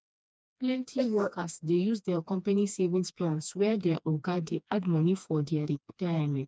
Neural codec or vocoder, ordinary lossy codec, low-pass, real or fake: codec, 16 kHz, 2 kbps, FreqCodec, smaller model; none; none; fake